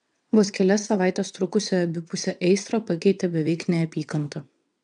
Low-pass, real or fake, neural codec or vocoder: 9.9 kHz; fake; vocoder, 22.05 kHz, 80 mel bands, WaveNeXt